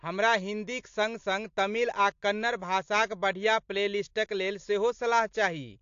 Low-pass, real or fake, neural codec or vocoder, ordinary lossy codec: 7.2 kHz; real; none; AAC, 48 kbps